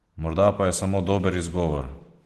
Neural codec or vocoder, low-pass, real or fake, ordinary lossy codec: none; 14.4 kHz; real; Opus, 16 kbps